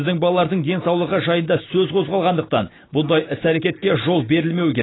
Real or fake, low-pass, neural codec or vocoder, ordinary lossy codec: real; 7.2 kHz; none; AAC, 16 kbps